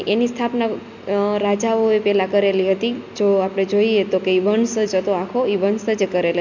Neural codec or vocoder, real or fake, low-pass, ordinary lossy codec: none; real; 7.2 kHz; none